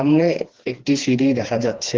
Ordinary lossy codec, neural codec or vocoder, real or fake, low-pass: Opus, 16 kbps; codec, 16 kHz, 2 kbps, FreqCodec, smaller model; fake; 7.2 kHz